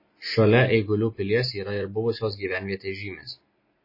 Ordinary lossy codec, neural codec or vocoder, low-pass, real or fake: MP3, 24 kbps; none; 5.4 kHz; real